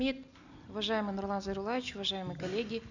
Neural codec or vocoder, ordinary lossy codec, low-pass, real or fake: none; none; 7.2 kHz; real